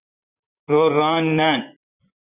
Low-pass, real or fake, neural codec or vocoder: 3.6 kHz; fake; vocoder, 44.1 kHz, 128 mel bands, Pupu-Vocoder